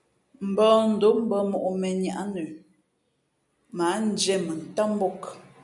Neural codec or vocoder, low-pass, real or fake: none; 10.8 kHz; real